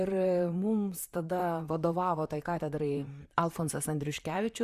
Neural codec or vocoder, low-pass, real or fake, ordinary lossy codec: vocoder, 44.1 kHz, 128 mel bands, Pupu-Vocoder; 14.4 kHz; fake; Opus, 64 kbps